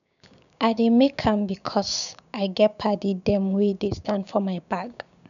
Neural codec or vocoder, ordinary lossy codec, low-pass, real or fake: codec, 16 kHz, 6 kbps, DAC; none; 7.2 kHz; fake